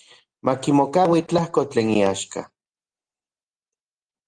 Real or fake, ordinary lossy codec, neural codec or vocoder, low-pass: real; Opus, 24 kbps; none; 9.9 kHz